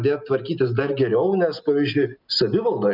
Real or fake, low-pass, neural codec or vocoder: real; 5.4 kHz; none